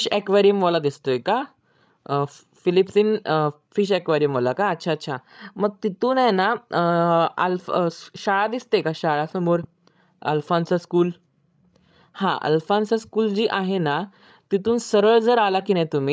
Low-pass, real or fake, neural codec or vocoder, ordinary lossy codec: none; fake; codec, 16 kHz, 16 kbps, FreqCodec, larger model; none